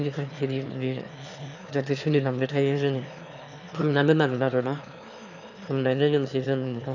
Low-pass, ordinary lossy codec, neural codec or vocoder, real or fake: 7.2 kHz; none; autoencoder, 22.05 kHz, a latent of 192 numbers a frame, VITS, trained on one speaker; fake